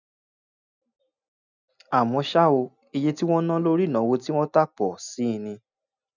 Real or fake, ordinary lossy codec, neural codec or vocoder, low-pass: real; none; none; 7.2 kHz